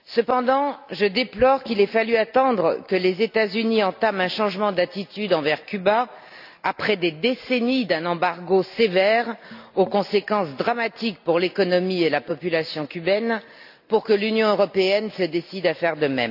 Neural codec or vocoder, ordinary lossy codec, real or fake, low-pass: none; AAC, 48 kbps; real; 5.4 kHz